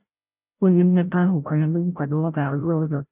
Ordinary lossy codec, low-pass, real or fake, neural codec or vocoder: none; 3.6 kHz; fake; codec, 16 kHz, 0.5 kbps, FreqCodec, larger model